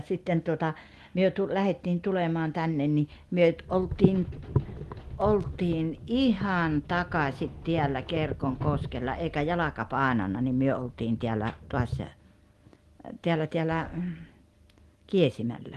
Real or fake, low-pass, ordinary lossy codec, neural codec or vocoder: real; 14.4 kHz; Opus, 24 kbps; none